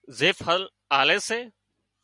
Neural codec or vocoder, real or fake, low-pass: none; real; 10.8 kHz